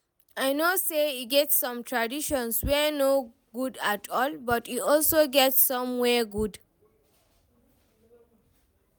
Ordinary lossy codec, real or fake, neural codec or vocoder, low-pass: none; real; none; none